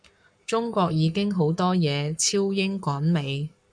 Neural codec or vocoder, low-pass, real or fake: codec, 44.1 kHz, 7.8 kbps, Pupu-Codec; 9.9 kHz; fake